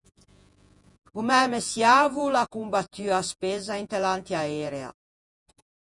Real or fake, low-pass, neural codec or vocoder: fake; 10.8 kHz; vocoder, 48 kHz, 128 mel bands, Vocos